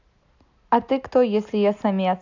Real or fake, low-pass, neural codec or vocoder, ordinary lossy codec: real; 7.2 kHz; none; AAC, 48 kbps